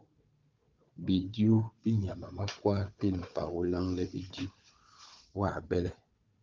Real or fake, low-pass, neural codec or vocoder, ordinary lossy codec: fake; 7.2 kHz; codec, 16 kHz, 4 kbps, FunCodec, trained on Chinese and English, 50 frames a second; Opus, 24 kbps